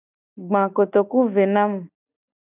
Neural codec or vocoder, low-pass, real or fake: none; 3.6 kHz; real